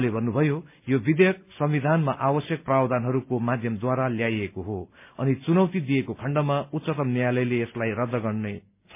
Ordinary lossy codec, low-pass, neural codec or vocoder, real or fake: MP3, 32 kbps; 3.6 kHz; none; real